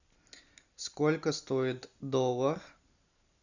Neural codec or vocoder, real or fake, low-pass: none; real; 7.2 kHz